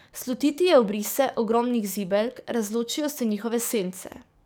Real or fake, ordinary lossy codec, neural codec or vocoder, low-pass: fake; none; codec, 44.1 kHz, 7.8 kbps, DAC; none